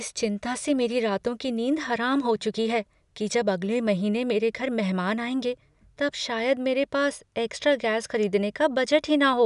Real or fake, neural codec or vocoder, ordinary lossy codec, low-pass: real; none; none; 10.8 kHz